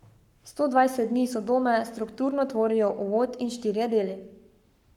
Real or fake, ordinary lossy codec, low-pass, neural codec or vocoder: fake; none; 19.8 kHz; codec, 44.1 kHz, 7.8 kbps, Pupu-Codec